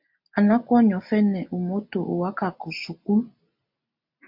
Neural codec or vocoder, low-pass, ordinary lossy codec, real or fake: none; 5.4 kHz; MP3, 48 kbps; real